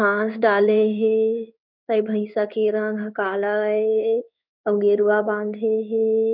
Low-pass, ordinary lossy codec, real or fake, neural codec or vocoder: 5.4 kHz; none; fake; codec, 16 kHz in and 24 kHz out, 1 kbps, XY-Tokenizer